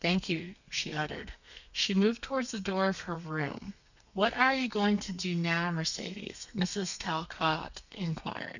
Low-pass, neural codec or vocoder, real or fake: 7.2 kHz; codec, 32 kHz, 1.9 kbps, SNAC; fake